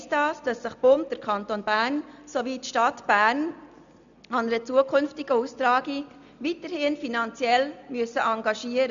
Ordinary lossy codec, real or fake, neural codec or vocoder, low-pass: none; real; none; 7.2 kHz